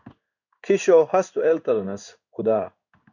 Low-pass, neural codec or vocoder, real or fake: 7.2 kHz; codec, 16 kHz in and 24 kHz out, 1 kbps, XY-Tokenizer; fake